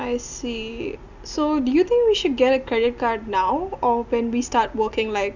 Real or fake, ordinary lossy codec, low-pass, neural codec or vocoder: real; none; 7.2 kHz; none